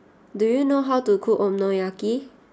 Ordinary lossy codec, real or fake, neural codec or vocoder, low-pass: none; real; none; none